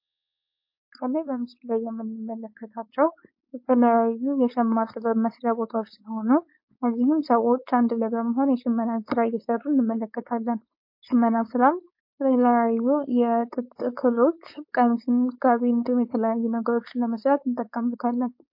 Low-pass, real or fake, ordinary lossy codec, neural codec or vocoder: 5.4 kHz; fake; MP3, 32 kbps; codec, 16 kHz, 4.8 kbps, FACodec